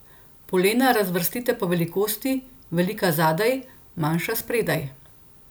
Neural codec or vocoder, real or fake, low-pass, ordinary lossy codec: none; real; none; none